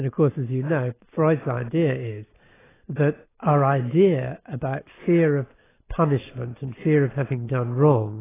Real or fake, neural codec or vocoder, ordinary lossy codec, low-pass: real; none; AAC, 16 kbps; 3.6 kHz